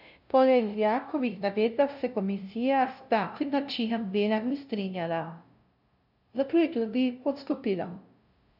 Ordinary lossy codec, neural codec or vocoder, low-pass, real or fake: none; codec, 16 kHz, 0.5 kbps, FunCodec, trained on LibriTTS, 25 frames a second; 5.4 kHz; fake